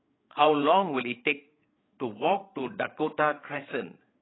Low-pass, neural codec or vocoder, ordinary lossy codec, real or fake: 7.2 kHz; codec, 16 kHz, 8 kbps, FreqCodec, larger model; AAC, 16 kbps; fake